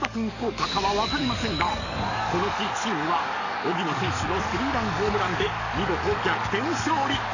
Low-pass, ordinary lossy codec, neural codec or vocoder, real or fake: 7.2 kHz; none; codec, 44.1 kHz, 7.8 kbps, DAC; fake